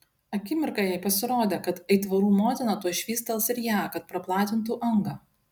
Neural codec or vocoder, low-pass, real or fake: none; 19.8 kHz; real